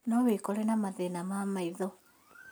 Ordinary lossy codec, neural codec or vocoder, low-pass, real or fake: none; none; none; real